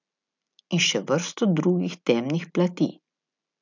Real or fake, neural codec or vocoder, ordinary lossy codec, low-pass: real; none; none; 7.2 kHz